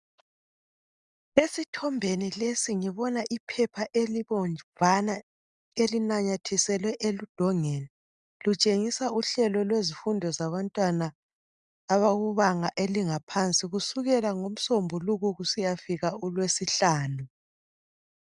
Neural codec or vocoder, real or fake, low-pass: none; real; 10.8 kHz